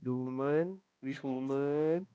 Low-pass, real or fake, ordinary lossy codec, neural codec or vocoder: none; fake; none; codec, 16 kHz, 1 kbps, X-Codec, HuBERT features, trained on balanced general audio